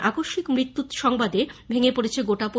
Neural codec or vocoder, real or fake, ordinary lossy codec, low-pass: none; real; none; none